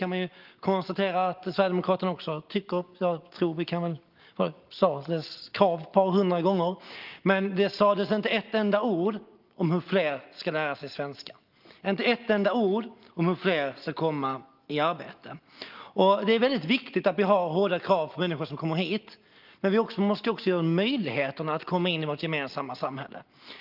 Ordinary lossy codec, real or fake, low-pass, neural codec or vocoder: Opus, 32 kbps; real; 5.4 kHz; none